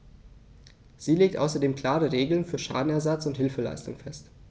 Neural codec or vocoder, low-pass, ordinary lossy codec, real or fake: none; none; none; real